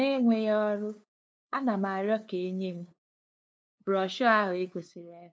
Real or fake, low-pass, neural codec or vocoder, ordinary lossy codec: fake; none; codec, 16 kHz, 2 kbps, FunCodec, trained on LibriTTS, 25 frames a second; none